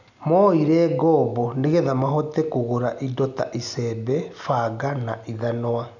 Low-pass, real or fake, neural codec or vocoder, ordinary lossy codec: 7.2 kHz; real; none; none